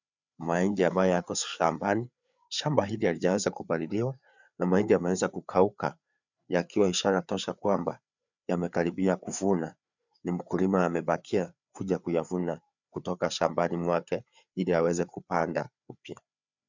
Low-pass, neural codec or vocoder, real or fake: 7.2 kHz; codec, 16 kHz, 4 kbps, FreqCodec, larger model; fake